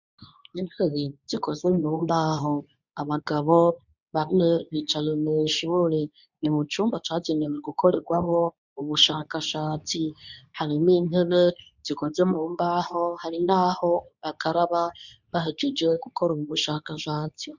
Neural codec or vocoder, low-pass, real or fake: codec, 24 kHz, 0.9 kbps, WavTokenizer, medium speech release version 2; 7.2 kHz; fake